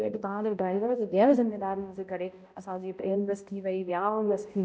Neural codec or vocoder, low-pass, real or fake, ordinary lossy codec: codec, 16 kHz, 0.5 kbps, X-Codec, HuBERT features, trained on balanced general audio; none; fake; none